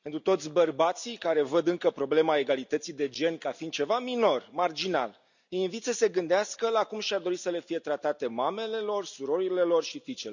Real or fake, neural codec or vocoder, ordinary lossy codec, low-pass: real; none; none; 7.2 kHz